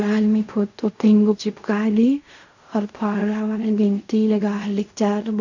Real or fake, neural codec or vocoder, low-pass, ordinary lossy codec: fake; codec, 16 kHz in and 24 kHz out, 0.4 kbps, LongCat-Audio-Codec, fine tuned four codebook decoder; 7.2 kHz; none